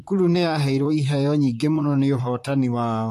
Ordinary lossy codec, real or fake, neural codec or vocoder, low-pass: AAC, 64 kbps; fake; vocoder, 44.1 kHz, 128 mel bands, Pupu-Vocoder; 14.4 kHz